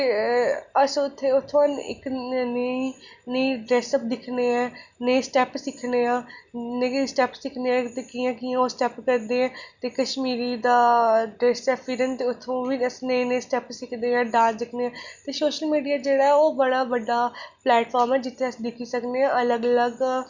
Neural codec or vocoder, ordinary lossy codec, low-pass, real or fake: none; Opus, 64 kbps; 7.2 kHz; real